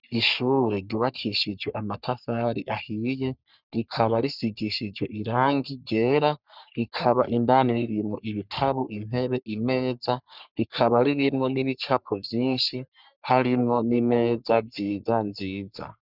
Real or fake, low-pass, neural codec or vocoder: fake; 5.4 kHz; codec, 44.1 kHz, 3.4 kbps, Pupu-Codec